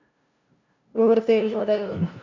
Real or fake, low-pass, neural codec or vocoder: fake; 7.2 kHz; codec, 16 kHz, 0.5 kbps, FunCodec, trained on LibriTTS, 25 frames a second